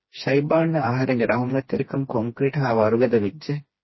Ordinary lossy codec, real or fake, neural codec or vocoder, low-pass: MP3, 24 kbps; fake; codec, 16 kHz, 2 kbps, FreqCodec, smaller model; 7.2 kHz